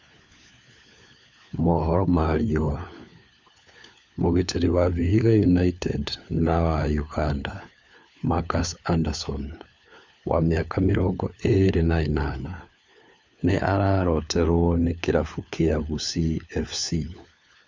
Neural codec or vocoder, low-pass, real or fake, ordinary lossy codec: codec, 16 kHz, 4 kbps, FunCodec, trained on LibriTTS, 50 frames a second; none; fake; none